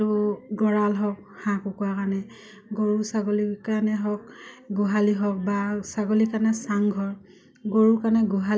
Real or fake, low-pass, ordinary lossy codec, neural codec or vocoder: real; none; none; none